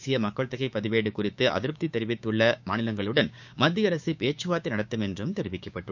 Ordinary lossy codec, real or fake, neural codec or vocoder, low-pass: none; fake; codec, 16 kHz, 6 kbps, DAC; 7.2 kHz